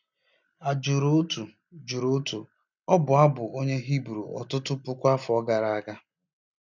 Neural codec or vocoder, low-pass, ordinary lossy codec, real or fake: none; 7.2 kHz; none; real